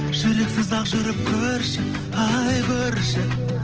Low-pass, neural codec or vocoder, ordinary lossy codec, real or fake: 7.2 kHz; none; Opus, 16 kbps; real